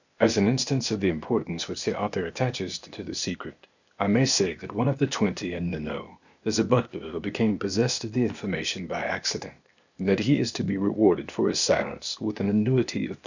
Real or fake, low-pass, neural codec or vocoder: fake; 7.2 kHz; codec, 16 kHz, 0.8 kbps, ZipCodec